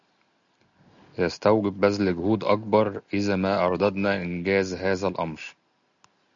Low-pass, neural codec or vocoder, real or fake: 7.2 kHz; none; real